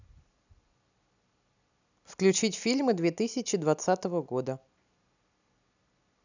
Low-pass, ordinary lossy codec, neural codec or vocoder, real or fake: 7.2 kHz; none; none; real